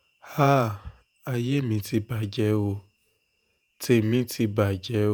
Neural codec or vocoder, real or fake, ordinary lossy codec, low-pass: vocoder, 48 kHz, 128 mel bands, Vocos; fake; none; none